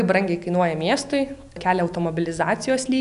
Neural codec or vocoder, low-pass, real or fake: none; 10.8 kHz; real